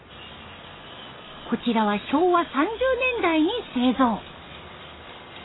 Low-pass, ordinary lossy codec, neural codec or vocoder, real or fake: 7.2 kHz; AAC, 16 kbps; none; real